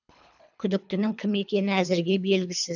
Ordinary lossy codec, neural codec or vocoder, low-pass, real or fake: none; codec, 24 kHz, 3 kbps, HILCodec; 7.2 kHz; fake